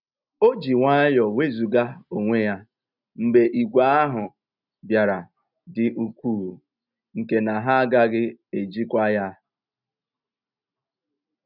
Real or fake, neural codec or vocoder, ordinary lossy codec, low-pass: real; none; none; 5.4 kHz